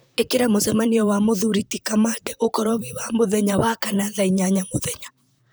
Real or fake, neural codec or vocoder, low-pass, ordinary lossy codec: fake; vocoder, 44.1 kHz, 128 mel bands, Pupu-Vocoder; none; none